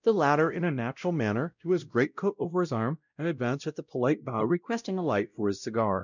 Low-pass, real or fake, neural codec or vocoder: 7.2 kHz; fake; codec, 16 kHz, 0.5 kbps, X-Codec, WavLM features, trained on Multilingual LibriSpeech